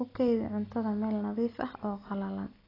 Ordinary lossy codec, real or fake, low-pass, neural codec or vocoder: AAC, 24 kbps; real; 5.4 kHz; none